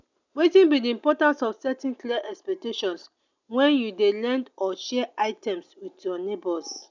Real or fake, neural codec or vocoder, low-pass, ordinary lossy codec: real; none; 7.2 kHz; none